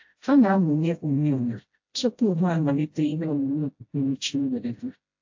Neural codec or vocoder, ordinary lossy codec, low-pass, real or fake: codec, 16 kHz, 0.5 kbps, FreqCodec, smaller model; AAC, 48 kbps; 7.2 kHz; fake